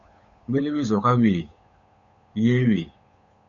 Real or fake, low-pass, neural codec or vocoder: fake; 7.2 kHz; codec, 16 kHz, 8 kbps, FunCodec, trained on Chinese and English, 25 frames a second